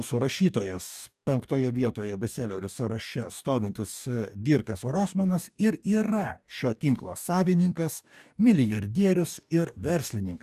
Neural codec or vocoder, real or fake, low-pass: codec, 44.1 kHz, 2.6 kbps, DAC; fake; 14.4 kHz